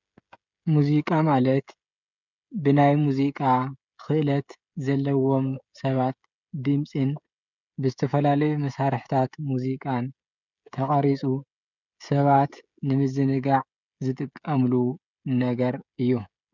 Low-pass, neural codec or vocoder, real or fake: 7.2 kHz; codec, 16 kHz, 16 kbps, FreqCodec, smaller model; fake